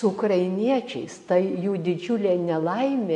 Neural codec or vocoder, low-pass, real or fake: none; 10.8 kHz; real